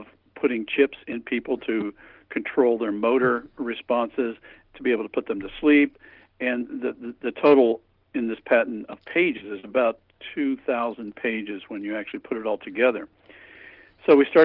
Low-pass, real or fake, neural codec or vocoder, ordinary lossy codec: 5.4 kHz; real; none; Opus, 32 kbps